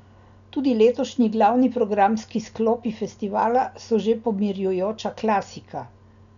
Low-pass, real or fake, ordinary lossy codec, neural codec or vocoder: 7.2 kHz; real; none; none